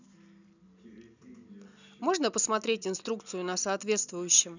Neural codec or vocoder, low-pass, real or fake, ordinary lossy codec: none; 7.2 kHz; real; none